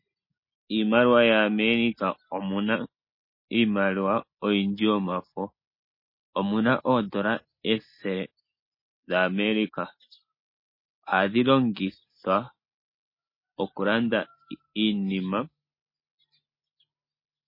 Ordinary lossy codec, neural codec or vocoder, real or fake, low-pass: MP3, 24 kbps; none; real; 5.4 kHz